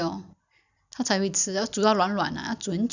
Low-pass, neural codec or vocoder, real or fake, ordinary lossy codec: 7.2 kHz; none; real; none